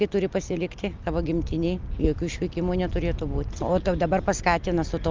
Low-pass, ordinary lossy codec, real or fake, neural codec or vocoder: 7.2 kHz; Opus, 32 kbps; real; none